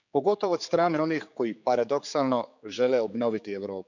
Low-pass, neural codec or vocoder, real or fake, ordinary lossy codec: 7.2 kHz; codec, 16 kHz, 4 kbps, X-Codec, HuBERT features, trained on general audio; fake; none